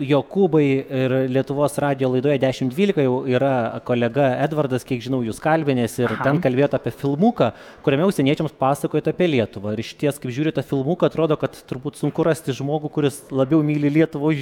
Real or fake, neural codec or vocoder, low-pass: fake; autoencoder, 48 kHz, 128 numbers a frame, DAC-VAE, trained on Japanese speech; 19.8 kHz